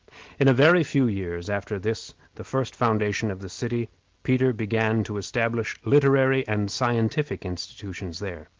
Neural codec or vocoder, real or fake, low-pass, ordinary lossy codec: none; real; 7.2 kHz; Opus, 16 kbps